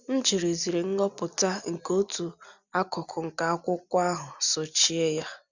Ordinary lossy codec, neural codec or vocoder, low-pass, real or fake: none; none; 7.2 kHz; real